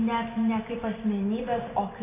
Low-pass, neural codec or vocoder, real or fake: 3.6 kHz; none; real